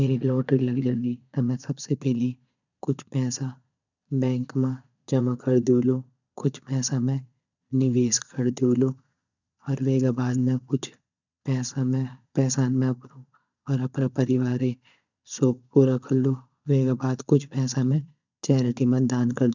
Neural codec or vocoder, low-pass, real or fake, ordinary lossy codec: vocoder, 22.05 kHz, 80 mel bands, Vocos; 7.2 kHz; fake; none